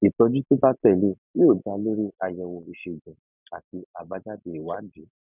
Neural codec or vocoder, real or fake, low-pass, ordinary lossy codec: none; real; 3.6 kHz; none